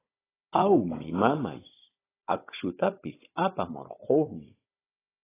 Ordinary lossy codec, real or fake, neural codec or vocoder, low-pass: AAC, 16 kbps; fake; codec, 16 kHz, 16 kbps, FunCodec, trained on Chinese and English, 50 frames a second; 3.6 kHz